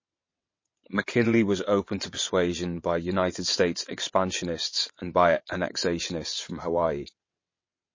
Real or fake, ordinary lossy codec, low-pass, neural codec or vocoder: fake; MP3, 32 kbps; 7.2 kHz; vocoder, 22.05 kHz, 80 mel bands, WaveNeXt